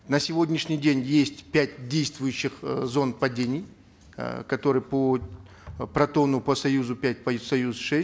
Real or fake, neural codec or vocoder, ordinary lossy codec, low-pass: real; none; none; none